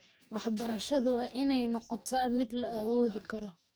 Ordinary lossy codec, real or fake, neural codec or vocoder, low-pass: none; fake; codec, 44.1 kHz, 2.6 kbps, DAC; none